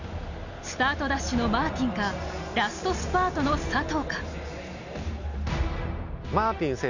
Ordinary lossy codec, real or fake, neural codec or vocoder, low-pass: none; real; none; 7.2 kHz